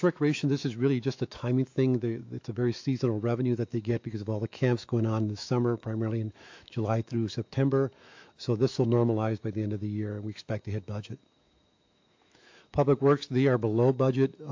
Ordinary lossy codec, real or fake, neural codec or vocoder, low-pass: AAC, 48 kbps; fake; autoencoder, 48 kHz, 128 numbers a frame, DAC-VAE, trained on Japanese speech; 7.2 kHz